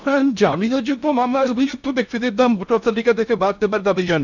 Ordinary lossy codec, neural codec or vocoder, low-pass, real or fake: none; codec, 16 kHz in and 24 kHz out, 0.6 kbps, FocalCodec, streaming, 4096 codes; 7.2 kHz; fake